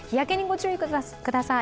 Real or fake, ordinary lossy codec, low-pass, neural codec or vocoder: real; none; none; none